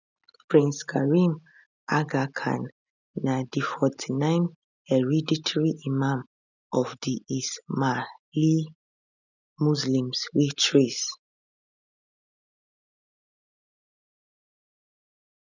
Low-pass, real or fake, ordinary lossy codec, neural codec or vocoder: 7.2 kHz; real; none; none